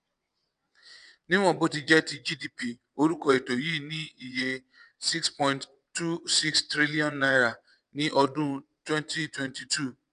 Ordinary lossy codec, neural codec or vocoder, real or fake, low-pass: none; vocoder, 22.05 kHz, 80 mel bands, WaveNeXt; fake; 9.9 kHz